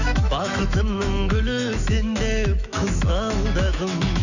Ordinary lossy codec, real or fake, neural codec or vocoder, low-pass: none; fake; autoencoder, 48 kHz, 128 numbers a frame, DAC-VAE, trained on Japanese speech; 7.2 kHz